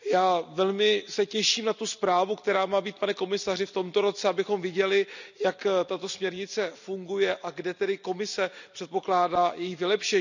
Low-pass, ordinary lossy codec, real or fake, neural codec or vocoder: 7.2 kHz; none; real; none